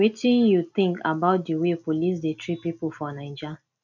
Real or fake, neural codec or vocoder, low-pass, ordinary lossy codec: real; none; 7.2 kHz; none